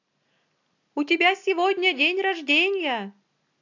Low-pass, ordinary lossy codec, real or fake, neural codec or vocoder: 7.2 kHz; AAC, 48 kbps; real; none